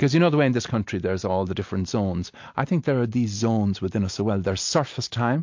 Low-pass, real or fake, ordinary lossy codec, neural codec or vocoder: 7.2 kHz; real; MP3, 48 kbps; none